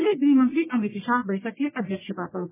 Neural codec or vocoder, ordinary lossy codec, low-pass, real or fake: codec, 44.1 kHz, 1.7 kbps, Pupu-Codec; MP3, 16 kbps; 3.6 kHz; fake